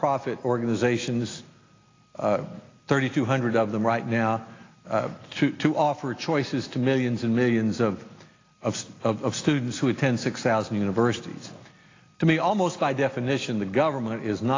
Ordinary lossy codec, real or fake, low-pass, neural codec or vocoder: AAC, 32 kbps; real; 7.2 kHz; none